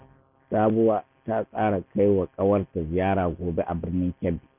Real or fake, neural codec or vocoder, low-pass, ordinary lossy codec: real; none; 3.6 kHz; none